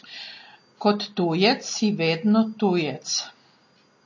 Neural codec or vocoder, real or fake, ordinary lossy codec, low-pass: none; real; MP3, 32 kbps; 7.2 kHz